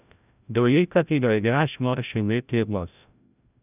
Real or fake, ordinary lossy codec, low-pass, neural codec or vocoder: fake; none; 3.6 kHz; codec, 16 kHz, 0.5 kbps, FreqCodec, larger model